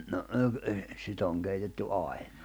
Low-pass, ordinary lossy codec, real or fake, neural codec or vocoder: none; none; fake; vocoder, 44.1 kHz, 128 mel bands every 256 samples, BigVGAN v2